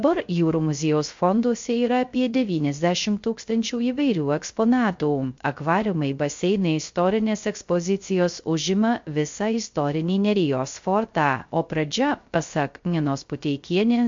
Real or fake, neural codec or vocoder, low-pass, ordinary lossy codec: fake; codec, 16 kHz, 0.3 kbps, FocalCodec; 7.2 kHz; MP3, 48 kbps